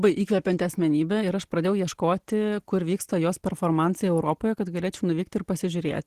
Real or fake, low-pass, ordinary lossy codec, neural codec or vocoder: real; 14.4 kHz; Opus, 16 kbps; none